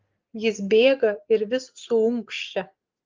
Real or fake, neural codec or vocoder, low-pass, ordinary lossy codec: real; none; 7.2 kHz; Opus, 32 kbps